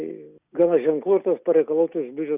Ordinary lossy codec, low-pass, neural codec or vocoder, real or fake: Opus, 64 kbps; 3.6 kHz; none; real